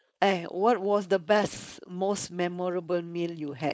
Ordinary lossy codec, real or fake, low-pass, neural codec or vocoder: none; fake; none; codec, 16 kHz, 4.8 kbps, FACodec